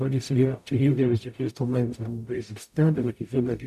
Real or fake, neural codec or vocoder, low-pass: fake; codec, 44.1 kHz, 0.9 kbps, DAC; 14.4 kHz